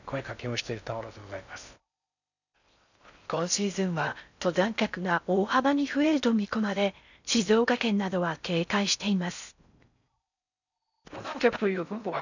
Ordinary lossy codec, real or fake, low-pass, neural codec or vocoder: none; fake; 7.2 kHz; codec, 16 kHz in and 24 kHz out, 0.6 kbps, FocalCodec, streaming, 4096 codes